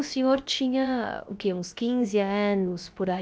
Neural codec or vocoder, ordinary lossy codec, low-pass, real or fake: codec, 16 kHz, about 1 kbps, DyCAST, with the encoder's durations; none; none; fake